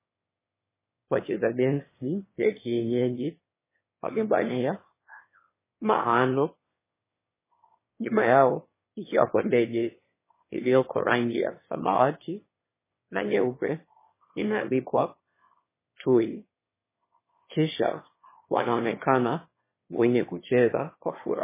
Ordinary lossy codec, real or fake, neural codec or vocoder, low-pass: MP3, 16 kbps; fake; autoencoder, 22.05 kHz, a latent of 192 numbers a frame, VITS, trained on one speaker; 3.6 kHz